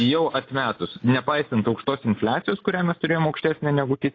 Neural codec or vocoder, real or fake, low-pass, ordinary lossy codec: none; real; 7.2 kHz; AAC, 32 kbps